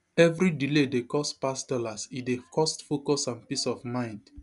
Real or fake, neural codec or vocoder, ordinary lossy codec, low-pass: real; none; none; 10.8 kHz